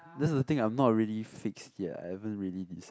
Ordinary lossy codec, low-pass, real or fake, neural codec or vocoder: none; none; real; none